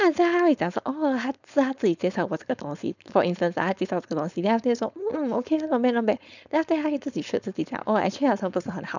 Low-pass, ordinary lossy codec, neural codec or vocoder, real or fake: 7.2 kHz; none; codec, 16 kHz, 4.8 kbps, FACodec; fake